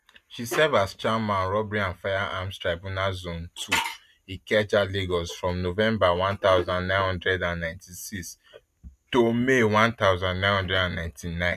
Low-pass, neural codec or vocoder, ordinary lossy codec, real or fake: 14.4 kHz; none; none; real